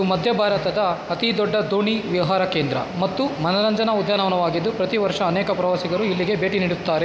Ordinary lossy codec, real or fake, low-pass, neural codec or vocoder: none; real; none; none